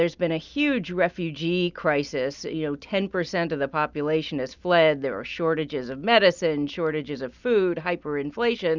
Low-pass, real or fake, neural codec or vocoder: 7.2 kHz; real; none